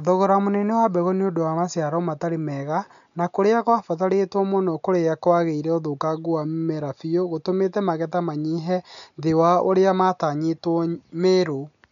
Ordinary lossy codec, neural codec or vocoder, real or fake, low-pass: MP3, 96 kbps; none; real; 7.2 kHz